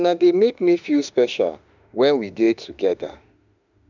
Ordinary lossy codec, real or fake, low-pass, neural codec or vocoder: none; fake; 7.2 kHz; autoencoder, 48 kHz, 32 numbers a frame, DAC-VAE, trained on Japanese speech